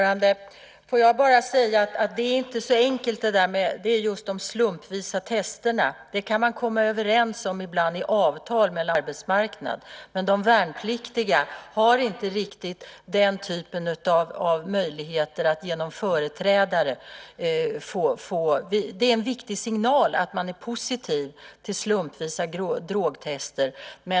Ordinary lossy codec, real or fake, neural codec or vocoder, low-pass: none; real; none; none